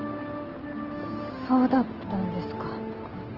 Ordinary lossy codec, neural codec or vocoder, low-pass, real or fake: Opus, 16 kbps; none; 5.4 kHz; real